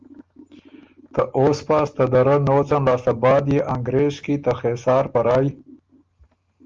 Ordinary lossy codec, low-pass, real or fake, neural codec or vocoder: Opus, 32 kbps; 7.2 kHz; real; none